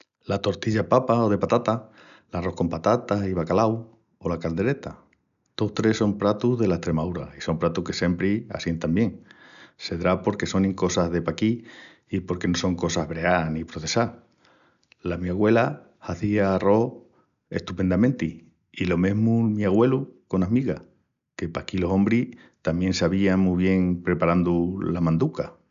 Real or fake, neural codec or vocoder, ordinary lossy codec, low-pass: real; none; none; 7.2 kHz